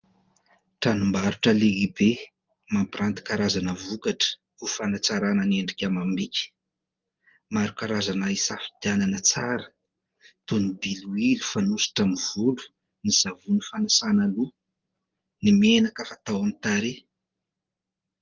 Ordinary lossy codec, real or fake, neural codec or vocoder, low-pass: Opus, 24 kbps; real; none; 7.2 kHz